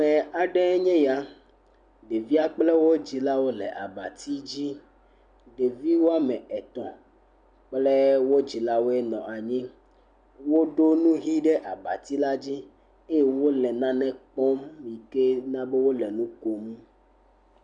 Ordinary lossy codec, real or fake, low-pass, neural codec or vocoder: MP3, 96 kbps; real; 9.9 kHz; none